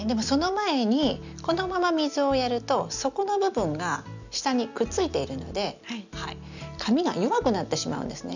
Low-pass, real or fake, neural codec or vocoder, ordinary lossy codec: 7.2 kHz; real; none; none